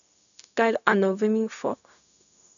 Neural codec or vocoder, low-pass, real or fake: codec, 16 kHz, 0.4 kbps, LongCat-Audio-Codec; 7.2 kHz; fake